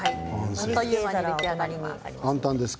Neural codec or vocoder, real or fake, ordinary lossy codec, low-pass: none; real; none; none